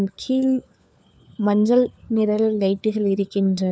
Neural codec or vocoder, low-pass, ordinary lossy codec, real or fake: codec, 16 kHz, 4 kbps, FunCodec, trained on LibriTTS, 50 frames a second; none; none; fake